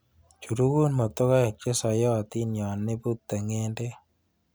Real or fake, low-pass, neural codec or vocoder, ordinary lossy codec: real; none; none; none